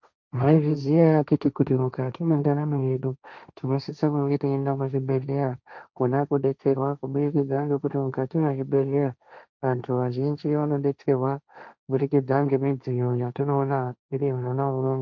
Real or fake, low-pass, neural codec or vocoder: fake; 7.2 kHz; codec, 16 kHz, 1.1 kbps, Voila-Tokenizer